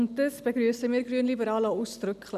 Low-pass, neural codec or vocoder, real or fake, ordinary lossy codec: 14.4 kHz; none; real; none